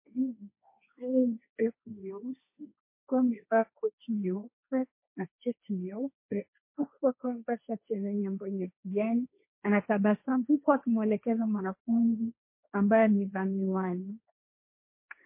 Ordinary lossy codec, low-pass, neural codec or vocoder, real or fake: MP3, 24 kbps; 3.6 kHz; codec, 16 kHz, 1.1 kbps, Voila-Tokenizer; fake